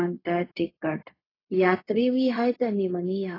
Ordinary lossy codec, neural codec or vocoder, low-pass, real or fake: AAC, 24 kbps; codec, 16 kHz, 0.4 kbps, LongCat-Audio-Codec; 5.4 kHz; fake